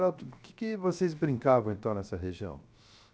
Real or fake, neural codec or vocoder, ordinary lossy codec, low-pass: fake; codec, 16 kHz, 0.7 kbps, FocalCodec; none; none